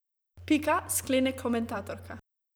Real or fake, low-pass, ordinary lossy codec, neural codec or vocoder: fake; none; none; vocoder, 44.1 kHz, 128 mel bands every 512 samples, BigVGAN v2